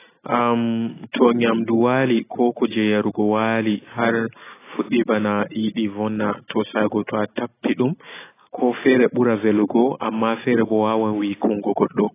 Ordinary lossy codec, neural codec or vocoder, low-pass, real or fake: AAC, 16 kbps; none; 3.6 kHz; real